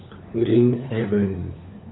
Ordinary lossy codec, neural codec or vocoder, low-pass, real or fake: AAC, 16 kbps; codec, 16 kHz, 4 kbps, FunCodec, trained on LibriTTS, 50 frames a second; 7.2 kHz; fake